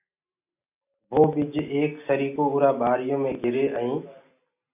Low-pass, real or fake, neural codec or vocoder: 3.6 kHz; real; none